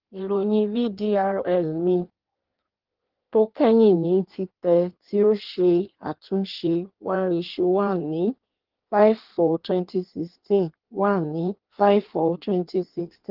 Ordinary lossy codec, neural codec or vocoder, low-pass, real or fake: Opus, 16 kbps; codec, 16 kHz in and 24 kHz out, 1.1 kbps, FireRedTTS-2 codec; 5.4 kHz; fake